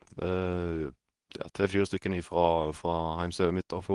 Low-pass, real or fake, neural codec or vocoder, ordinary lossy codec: 10.8 kHz; fake; codec, 24 kHz, 0.9 kbps, WavTokenizer, medium speech release version 2; Opus, 24 kbps